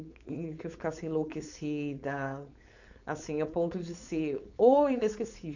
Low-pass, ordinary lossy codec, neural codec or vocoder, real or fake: 7.2 kHz; none; codec, 16 kHz, 4.8 kbps, FACodec; fake